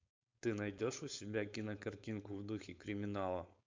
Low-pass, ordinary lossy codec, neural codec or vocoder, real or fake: 7.2 kHz; MP3, 64 kbps; codec, 16 kHz, 4.8 kbps, FACodec; fake